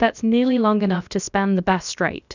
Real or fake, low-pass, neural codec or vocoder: fake; 7.2 kHz; codec, 16 kHz, about 1 kbps, DyCAST, with the encoder's durations